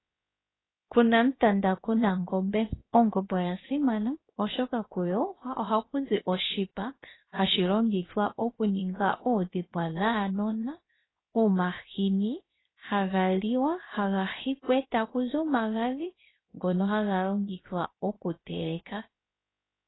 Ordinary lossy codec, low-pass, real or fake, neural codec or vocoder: AAC, 16 kbps; 7.2 kHz; fake; codec, 16 kHz, 0.7 kbps, FocalCodec